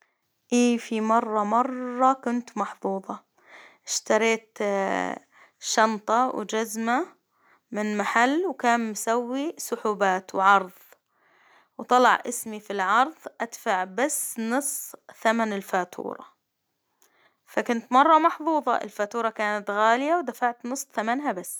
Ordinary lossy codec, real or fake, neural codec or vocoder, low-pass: none; real; none; none